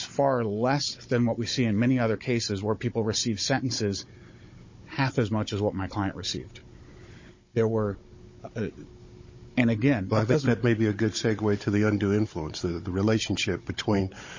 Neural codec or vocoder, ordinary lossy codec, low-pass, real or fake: codec, 16 kHz, 16 kbps, FunCodec, trained on Chinese and English, 50 frames a second; MP3, 32 kbps; 7.2 kHz; fake